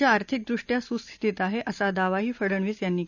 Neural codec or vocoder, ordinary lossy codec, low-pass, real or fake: none; none; 7.2 kHz; real